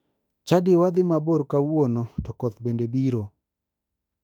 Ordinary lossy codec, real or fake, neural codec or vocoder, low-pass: none; fake; autoencoder, 48 kHz, 32 numbers a frame, DAC-VAE, trained on Japanese speech; 19.8 kHz